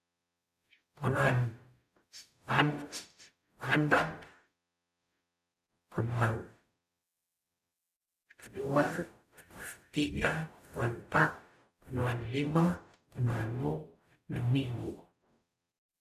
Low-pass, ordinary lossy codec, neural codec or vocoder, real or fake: 14.4 kHz; none; codec, 44.1 kHz, 0.9 kbps, DAC; fake